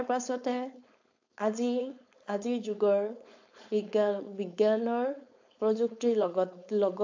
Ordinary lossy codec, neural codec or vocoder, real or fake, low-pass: AAC, 48 kbps; codec, 16 kHz, 4.8 kbps, FACodec; fake; 7.2 kHz